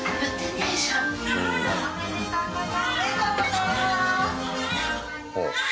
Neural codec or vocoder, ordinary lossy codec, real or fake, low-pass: none; none; real; none